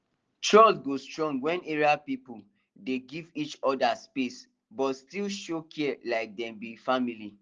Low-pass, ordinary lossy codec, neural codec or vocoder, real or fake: 7.2 kHz; Opus, 16 kbps; none; real